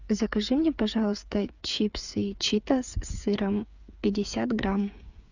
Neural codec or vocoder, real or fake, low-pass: codec, 16 kHz, 8 kbps, FreqCodec, smaller model; fake; 7.2 kHz